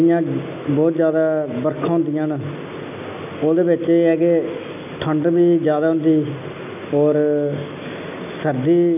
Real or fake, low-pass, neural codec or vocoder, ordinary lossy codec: real; 3.6 kHz; none; none